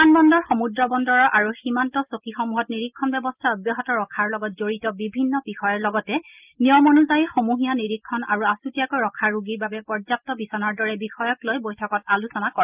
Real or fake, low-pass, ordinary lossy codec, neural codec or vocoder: real; 3.6 kHz; Opus, 24 kbps; none